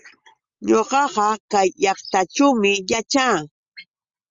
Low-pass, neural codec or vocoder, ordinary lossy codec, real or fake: 7.2 kHz; none; Opus, 32 kbps; real